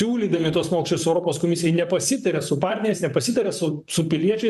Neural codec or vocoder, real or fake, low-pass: vocoder, 44.1 kHz, 128 mel bands, Pupu-Vocoder; fake; 14.4 kHz